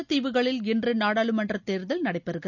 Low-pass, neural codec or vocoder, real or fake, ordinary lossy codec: 7.2 kHz; none; real; none